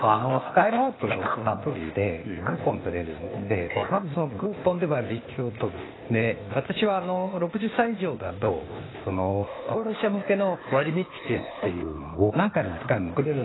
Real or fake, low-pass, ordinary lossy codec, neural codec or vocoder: fake; 7.2 kHz; AAC, 16 kbps; codec, 16 kHz, 0.8 kbps, ZipCodec